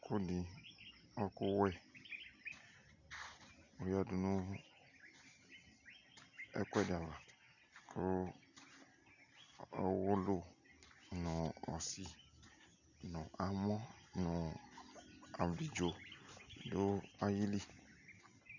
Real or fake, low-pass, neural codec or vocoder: real; 7.2 kHz; none